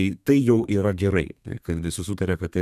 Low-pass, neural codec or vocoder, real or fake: 14.4 kHz; codec, 32 kHz, 1.9 kbps, SNAC; fake